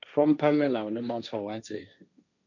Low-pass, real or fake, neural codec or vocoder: 7.2 kHz; fake; codec, 16 kHz, 1.1 kbps, Voila-Tokenizer